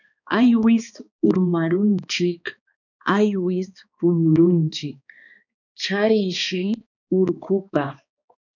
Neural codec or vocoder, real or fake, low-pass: codec, 16 kHz, 2 kbps, X-Codec, HuBERT features, trained on balanced general audio; fake; 7.2 kHz